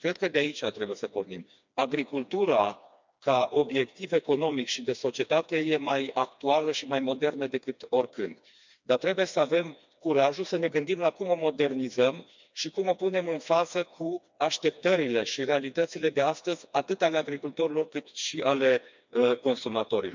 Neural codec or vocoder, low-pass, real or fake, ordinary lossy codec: codec, 16 kHz, 2 kbps, FreqCodec, smaller model; 7.2 kHz; fake; MP3, 64 kbps